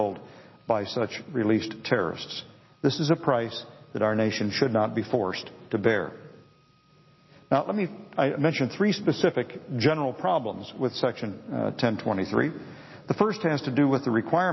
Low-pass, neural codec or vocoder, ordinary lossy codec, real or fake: 7.2 kHz; none; MP3, 24 kbps; real